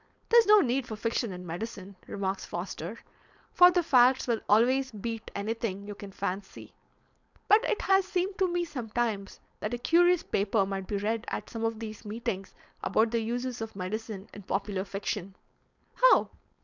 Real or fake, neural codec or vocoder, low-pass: fake; codec, 16 kHz, 4.8 kbps, FACodec; 7.2 kHz